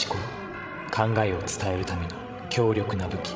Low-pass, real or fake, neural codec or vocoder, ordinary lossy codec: none; fake; codec, 16 kHz, 16 kbps, FreqCodec, larger model; none